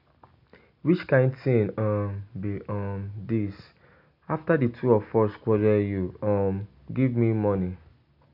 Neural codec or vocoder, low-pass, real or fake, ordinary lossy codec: none; 5.4 kHz; real; AAC, 48 kbps